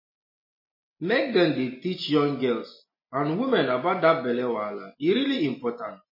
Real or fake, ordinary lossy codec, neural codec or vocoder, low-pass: real; MP3, 24 kbps; none; 5.4 kHz